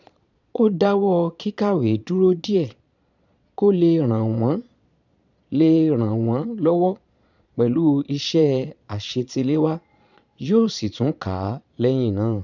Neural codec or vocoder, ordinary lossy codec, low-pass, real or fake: vocoder, 22.05 kHz, 80 mel bands, Vocos; none; 7.2 kHz; fake